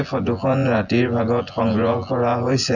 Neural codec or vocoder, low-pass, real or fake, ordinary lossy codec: vocoder, 24 kHz, 100 mel bands, Vocos; 7.2 kHz; fake; none